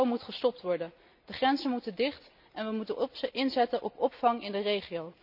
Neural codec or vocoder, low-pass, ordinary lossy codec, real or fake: none; 5.4 kHz; none; real